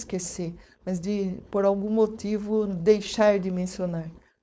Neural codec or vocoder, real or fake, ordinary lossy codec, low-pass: codec, 16 kHz, 4.8 kbps, FACodec; fake; none; none